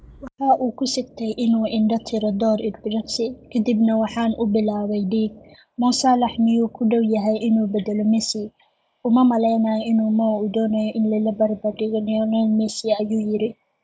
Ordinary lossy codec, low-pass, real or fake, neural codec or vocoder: none; none; real; none